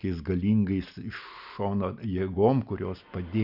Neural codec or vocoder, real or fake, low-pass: none; real; 5.4 kHz